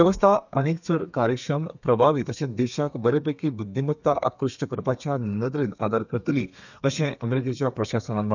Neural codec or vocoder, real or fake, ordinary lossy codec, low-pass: codec, 32 kHz, 1.9 kbps, SNAC; fake; none; 7.2 kHz